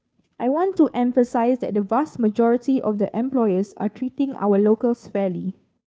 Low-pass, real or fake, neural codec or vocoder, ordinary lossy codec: none; fake; codec, 16 kHz, 2 kbps, FunCodec, trained on Chinese and English, 25 frames a second; none